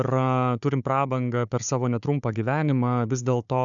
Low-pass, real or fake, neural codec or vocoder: 7.2 kHz; fake; codec, 16 kHz, 4 kbps, FunCodec, trained on Chinese and English, 50 frames a second